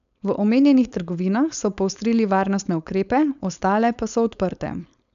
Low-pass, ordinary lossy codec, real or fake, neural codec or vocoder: 7.2 kHz; MP3, 96 kbps; fake; codec, 16 kHz, 4.8 kbps, FACodec